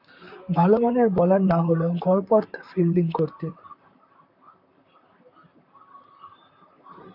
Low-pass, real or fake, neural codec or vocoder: 5.4 kHz; fake; vocoder, 44.1 kHz, 128 mel bands, Pupu-Vocoder